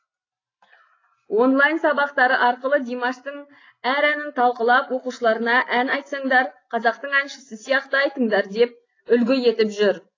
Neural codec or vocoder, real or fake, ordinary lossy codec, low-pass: none; real; AAC, 32 kbps; 7.2 kHz